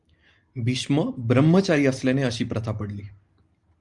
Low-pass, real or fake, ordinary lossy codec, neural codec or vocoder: 10.8 kHz; real; Opus, 32 kbps; none